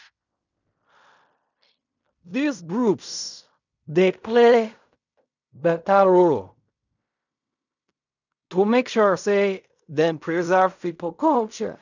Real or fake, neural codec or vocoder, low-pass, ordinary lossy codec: fake; codec, 16 kHz in and 24 kHz out, 0.4 kbps, LongCat-Audio-Codec, fine tuned four codebook decoder; 7.2 kHz; none